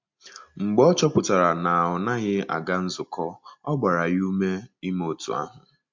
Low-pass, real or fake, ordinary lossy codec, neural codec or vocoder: 7.2 kHz; real; MP3, 48 kbps; none